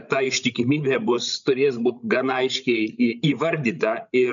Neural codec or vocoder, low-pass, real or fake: codec, 16 kHz, 16 kbps, FreqCodec, larger model; 7.2 kHz; fake